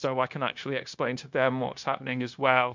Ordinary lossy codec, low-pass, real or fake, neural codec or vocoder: MP3, 64 kbps; 7.2 kHz; fake; codec, 16 kHz, 0.9 kbps, LongCat-Audio-Codec